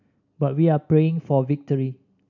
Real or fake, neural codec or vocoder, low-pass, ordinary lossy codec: real; none; 7.2 kHz; none